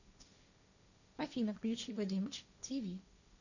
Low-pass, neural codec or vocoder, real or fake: 7.2 kHz; codec, 16 kHz, 1.1 kbps, Voila-Tokenizer; fake